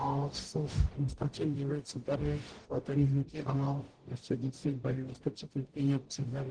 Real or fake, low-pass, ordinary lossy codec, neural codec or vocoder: fake; 9.9 kHz; Opus, 16 kbps; codec, 44.1 kHz, 0.9 kbps, DAC